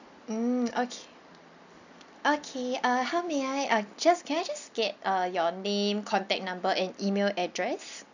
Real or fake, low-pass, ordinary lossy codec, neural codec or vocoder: real; 7.2 kHz; none; none